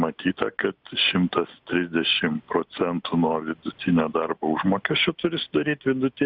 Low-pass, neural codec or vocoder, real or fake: 5.4 kHz; none; real